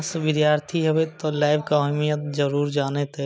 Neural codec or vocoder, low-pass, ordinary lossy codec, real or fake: none; none; none; real